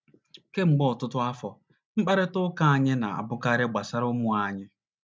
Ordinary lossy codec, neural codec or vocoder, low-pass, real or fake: none; none; none; real